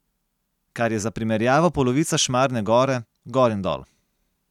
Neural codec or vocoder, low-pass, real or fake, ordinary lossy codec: none; 19.8 kHz; real; none